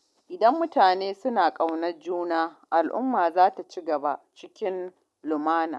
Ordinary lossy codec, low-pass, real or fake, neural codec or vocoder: none; none; real; none